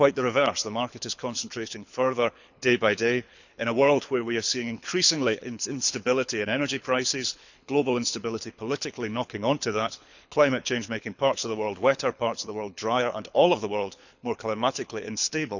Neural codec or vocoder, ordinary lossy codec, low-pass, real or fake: codec, 24 kHz, 6 kbps, HILCodec; none; 7.2 kHz; fake